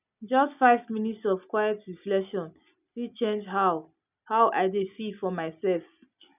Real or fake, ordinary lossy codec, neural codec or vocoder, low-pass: real; none; none; 3.6 kHz